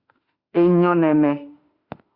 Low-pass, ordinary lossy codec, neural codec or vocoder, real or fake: 5.4 kHz; Opus, 64 kbps; autoencoder, 48 kHz, 32 numbers a frame, DAC-VAE, trained on Japanese speech; fake